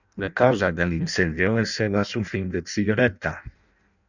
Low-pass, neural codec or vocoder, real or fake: 7.2 kHz; codec, 16 kHz in and 24 kHz out, 0.6 kbps, FireRedTTS-2 codec; fake